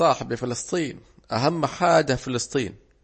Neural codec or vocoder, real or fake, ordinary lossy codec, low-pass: none; real; MP3, 32 kbps; 10.8 kHz